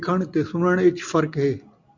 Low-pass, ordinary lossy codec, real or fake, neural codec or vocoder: 7.2 kHz; MP3, 64 kbps; real; none